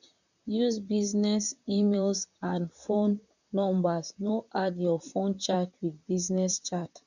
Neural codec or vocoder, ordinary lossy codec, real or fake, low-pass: vocoder, 44.1 kHz, 128 mel bands, Pupu-Vocoder; none; fake; 7.2 kHz